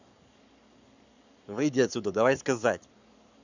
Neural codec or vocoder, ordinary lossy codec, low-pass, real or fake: codec, 44.1 kHz, 7.8 kbps, Pupu-Codec; none; 7.2 kHz; fake